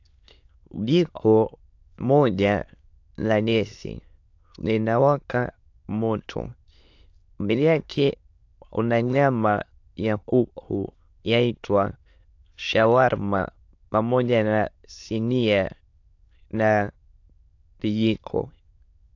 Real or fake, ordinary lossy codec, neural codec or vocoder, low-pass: fake; AAC, 48 kbps; autoencoder, 22.05 kHz, a latent of 192 numbers a frame, VITS, trained on many speakers; 7.2 kHz